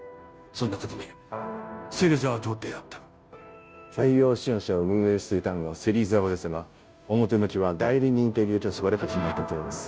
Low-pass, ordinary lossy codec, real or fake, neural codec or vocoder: none; none; fake; codec, 16 kHz, 0.5 kbps, FunCodec, trained on Chinese and English, 25 frames a second